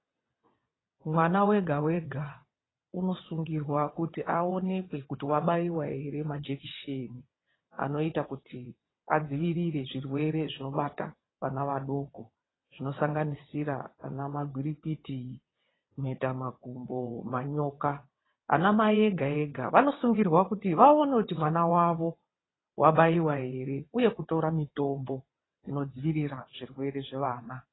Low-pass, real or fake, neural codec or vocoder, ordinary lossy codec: 7.2 kHz; fake; vocoder, 22.05 kHz, 80 mel bands, WaveNeXt; AAC, 16 kbps